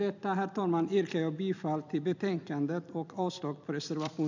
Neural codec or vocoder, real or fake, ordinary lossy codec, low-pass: vocoder, 44.1 kHz, 128 mel bands every 256 samples, BigVGAN v2; fake; none; 7.2 kHz